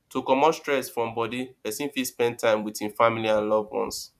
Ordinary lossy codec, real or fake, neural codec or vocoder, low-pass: none; fake; vocoder, 44.1 kHz, 128 mel bands every 512 samples, BigVGAN v2; 14.4 kHz